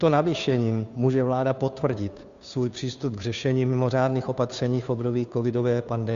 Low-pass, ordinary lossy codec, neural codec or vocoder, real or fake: 7.2 kHz; Opus, 64 kbps; codec, 16 kHz, 2 kbps, FunCodec, trained on Chinese and English, 25 frames a second; fake